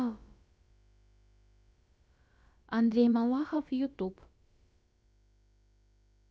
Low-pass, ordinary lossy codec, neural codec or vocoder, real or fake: none; none; codec, 16 kHz, about 1 kbps, DyCAST, with the encoder's durations; fake